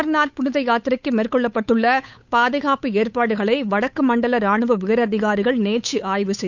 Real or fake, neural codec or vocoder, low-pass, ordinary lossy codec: fake; codec, 16 kHz, 8 kbps, FunCodec, trained on Chinese and English, 25 frames a second; 7.2 kHz; none